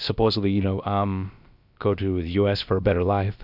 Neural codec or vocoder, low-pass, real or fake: codec, 16 kHz, about 1 kbps, DyCAST, with the encoder's durations; 5.4 kHz; fake